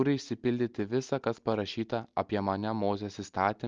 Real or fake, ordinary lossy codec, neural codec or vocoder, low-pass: real; Opus, 24 kbps; none; 7.2 kHz